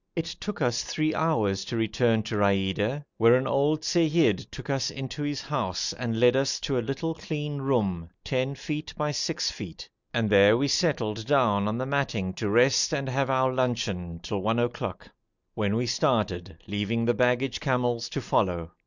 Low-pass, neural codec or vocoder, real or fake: 7.2 kHz; none; real